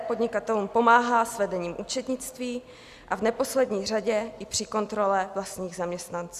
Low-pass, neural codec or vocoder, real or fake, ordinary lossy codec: 14.4 kHz; none; real; Opus, 64 kbps